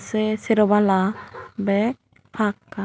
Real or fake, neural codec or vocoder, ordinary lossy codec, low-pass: real; none; none; none